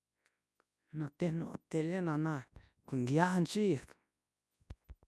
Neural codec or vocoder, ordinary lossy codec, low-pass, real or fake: codec, 24 kHz, 0.9 kbps, WavTokenizer, large speech release; none; none; fake